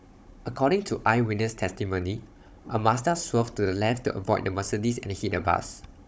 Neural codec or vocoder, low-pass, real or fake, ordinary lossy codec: codec, 16 kHz, 16 kbps, FunCodec, trained on Chinese and English, 50 frames a second; none; fake; none